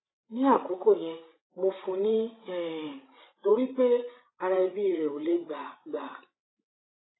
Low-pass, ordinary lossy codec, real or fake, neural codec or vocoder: 7.2 kHz; AAC, 16 kbps; fake; codec, 16 kHz, 16 kbps, FreqCodec, larger model